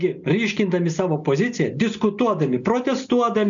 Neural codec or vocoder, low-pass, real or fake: none; 7.2 kHz; real